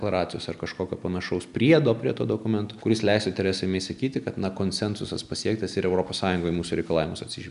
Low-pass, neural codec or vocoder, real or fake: 10.8 kHz; none; real